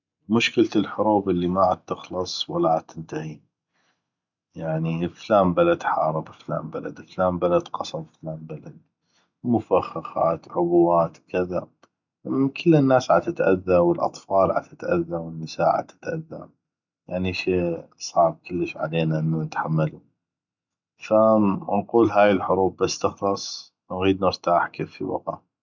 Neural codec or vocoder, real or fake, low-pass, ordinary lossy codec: none; real; 7.2 kHz; none